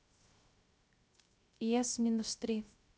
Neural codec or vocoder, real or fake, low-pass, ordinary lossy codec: codec, 16 kHz, 0.3 kbps, FocalCodec; fake; none; none